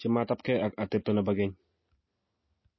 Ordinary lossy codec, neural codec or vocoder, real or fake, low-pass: MP3, 24 kbps; none; real; 7.2 kHz